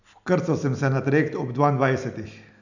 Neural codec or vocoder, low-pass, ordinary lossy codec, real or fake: none; 7.2 kHz; none; real